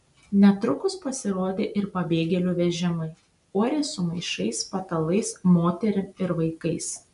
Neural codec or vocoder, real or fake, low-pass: none; real; 10.8 kHz